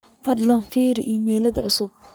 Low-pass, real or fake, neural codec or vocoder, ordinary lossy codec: none; fake; codec, 44.1 kHz, 3.4 kbps, Pupu-Codec; none